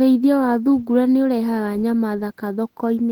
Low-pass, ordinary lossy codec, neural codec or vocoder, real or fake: 19.8 kHz; Opus, 16 kbps; none; real